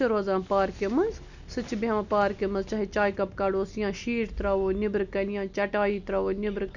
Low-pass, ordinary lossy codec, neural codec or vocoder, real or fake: 7.2 kHz; none; none; real